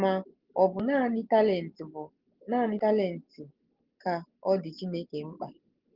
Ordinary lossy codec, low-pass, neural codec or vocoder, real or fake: Opus, 16 kbps; 5.4 kHz; none; real